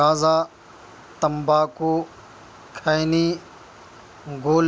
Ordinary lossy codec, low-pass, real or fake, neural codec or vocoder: none; none; real; none